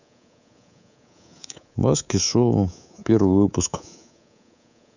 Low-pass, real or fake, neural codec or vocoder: 7.2 kHz; fake; codec, 24 kHz, 3.1 kbps, DualCodec